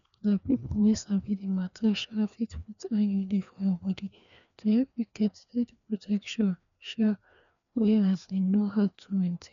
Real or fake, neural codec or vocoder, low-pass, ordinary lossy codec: fake; codec, 16 kHz, 2 kbps, FreqCodec, larger model; 7.2 kHz; none